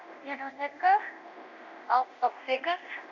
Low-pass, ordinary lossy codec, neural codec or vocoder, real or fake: 7.2 kHz; none; codec, 24 kHz, 0.9 kbps, DualCodec; fake